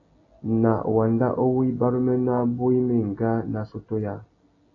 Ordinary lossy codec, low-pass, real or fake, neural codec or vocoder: AAC, 32 kbps; 7.2 kHz; real; none